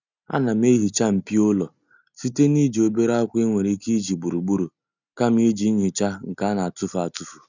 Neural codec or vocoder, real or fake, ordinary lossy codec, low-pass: none; real; none; 7.2 kHz